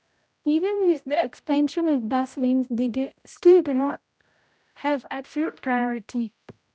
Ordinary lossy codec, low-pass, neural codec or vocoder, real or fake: none; none; codec, 16 kHz, 0.5 kbps, X-Codec, HuBERT features, trained on general audio; fake